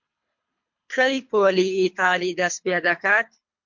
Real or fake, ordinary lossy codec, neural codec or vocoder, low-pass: fake; MP3, 48 kbps; codec, 24 kHz, 3 kbps, HILCodec; 7.2 kHz